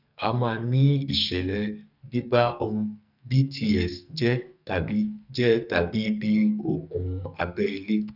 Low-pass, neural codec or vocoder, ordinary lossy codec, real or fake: 5.4 kHz; codec, 44.1 kHz, 2.6 kbps, SNAC; none; fake